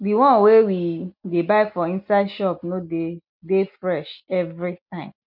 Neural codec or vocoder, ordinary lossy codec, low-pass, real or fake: none; none; 5.4 kHz; real